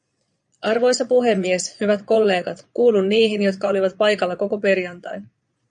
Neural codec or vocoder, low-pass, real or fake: vocoder, 22.05 kHz, 80 mel bands, Vocos; 9.9 kHz; fake